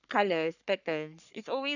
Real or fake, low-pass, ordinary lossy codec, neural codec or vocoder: fake; 7.2 kHz; none; codec, 44.1 kHz, 3.4 kbps, Pupu-Codec